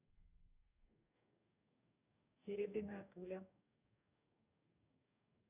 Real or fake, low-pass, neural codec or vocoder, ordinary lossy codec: fake; 3.6 kHz; codec, 44.1 kHz, 2.6 kbps, DAC; none